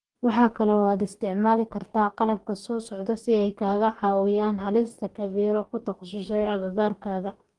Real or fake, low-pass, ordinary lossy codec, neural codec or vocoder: fake; 10.8 kHz; Opus, 24 kbps; codec, 44.1 kHz, 2.6 kbps, DAC